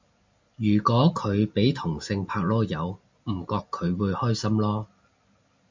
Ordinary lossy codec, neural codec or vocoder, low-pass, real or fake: MP3, 64 kbps; none; 7.2 kHz; real